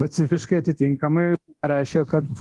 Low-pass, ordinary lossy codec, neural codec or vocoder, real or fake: 10.8 kHz; Opus, 24 kbps; codec, 24 kHz, 0.9 kbps, DualCodec; fake